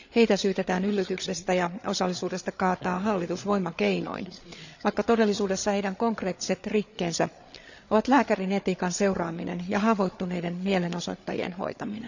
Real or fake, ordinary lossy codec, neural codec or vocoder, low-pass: fake; none; codec, 16 kHz, 8 kbps, FreqCodec, larger model; 7.2 kHz